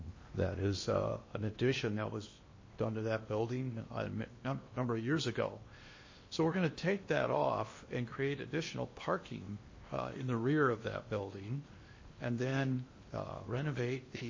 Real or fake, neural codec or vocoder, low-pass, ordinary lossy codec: fake; codec, 16 kHz in and 24 kHz out, 0.8 kbps, FocalCodec, streaming, 65536 codes; 7.2 kHz; MP3, 32 kbps